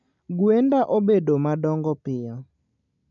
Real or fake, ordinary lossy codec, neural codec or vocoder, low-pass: real; MP3, 64 kbps; none; 7.2 kHz